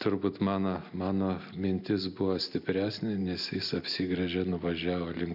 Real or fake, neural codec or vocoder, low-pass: real; none; 5.4 kHz